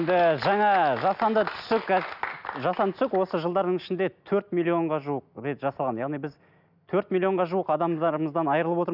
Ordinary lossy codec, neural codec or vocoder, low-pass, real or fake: none; none; 5.4 kHz; real